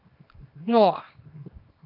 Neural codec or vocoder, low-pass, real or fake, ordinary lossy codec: codec, 24 kHz, 0.9 kbps, WavTokenizer, small release; 5.4 kHz; fake; AAC, 48 kbps